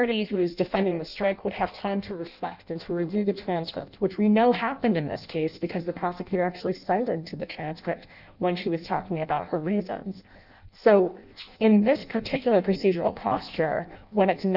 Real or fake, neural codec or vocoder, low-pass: fake; codec, 16 kHz in and 24 kHz out, 0.6 kbps, FireRedTTS-2 codec; 5.4 kHz